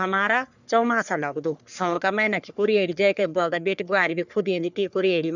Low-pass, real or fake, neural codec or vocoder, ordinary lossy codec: 7.2 kHz; fake; codec, 44.1 kHz, 3.4 kbps, Pupu-Codec; none